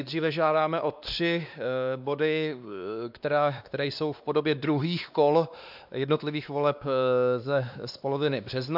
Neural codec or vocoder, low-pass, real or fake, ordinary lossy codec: codec, 16 kHz, 2 kbps, X-Codec, WavLM features, trained on Multilingual LibriSpeech; 5.4 kHz; fake; AAC, 48 kbps